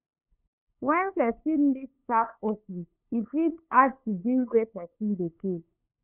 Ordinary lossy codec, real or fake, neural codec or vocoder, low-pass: none; fake; codec, 16 kHz, 2 kbps, FunCodec, trained on LibriTTS, 25 frames a second; 3.6 kHz